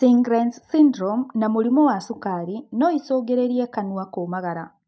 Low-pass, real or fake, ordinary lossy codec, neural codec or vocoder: none; real; none; none